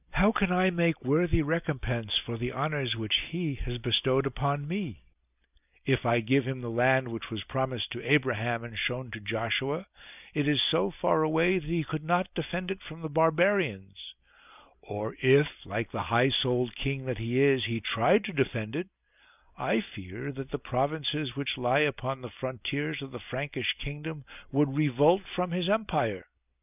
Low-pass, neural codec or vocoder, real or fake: 3.6 kHz; none; real